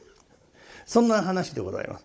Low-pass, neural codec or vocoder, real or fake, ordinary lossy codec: none; codec, 16 kHz, 16 kbps, FunCodec, trained on Chinese and English, 50 frames a second; fake; none